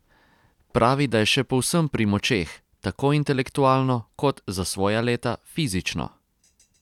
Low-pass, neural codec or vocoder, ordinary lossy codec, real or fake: 19.8 kHz; none; none; real